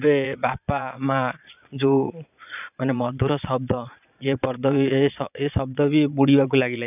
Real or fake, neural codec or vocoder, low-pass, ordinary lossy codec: fake; vocoder, 22.05 kHz, 80 mel bands, Vocos; 3.6 kHz; none